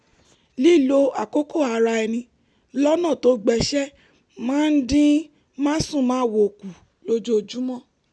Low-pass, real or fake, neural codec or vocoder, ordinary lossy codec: none; real; none; none